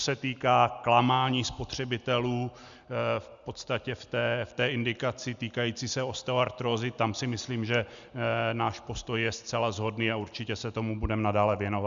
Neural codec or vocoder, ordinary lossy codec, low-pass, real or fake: none; Opus, 64 kbps; 7.2 kHz; real